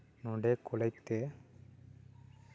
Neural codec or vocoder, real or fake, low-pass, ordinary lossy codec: none; real; none; none